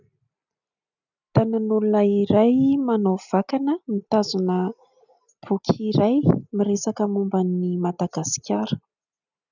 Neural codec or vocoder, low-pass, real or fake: none; 7.2 kHz; real